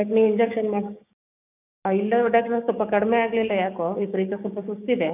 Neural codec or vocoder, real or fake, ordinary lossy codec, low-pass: codec, 16 kHz, 6 kbps, DAC; fake; none; 3.6 kHz